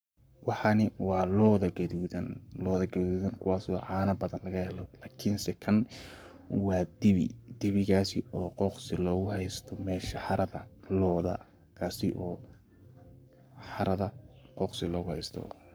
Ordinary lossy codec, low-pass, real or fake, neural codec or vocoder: none; none; fake; codec, 44.1 kHz, 7.8 kbps, Pupu-Codec